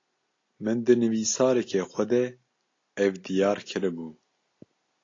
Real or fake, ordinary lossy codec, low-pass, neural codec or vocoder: real; AAC, 32 kbps; 7.2 kHz; none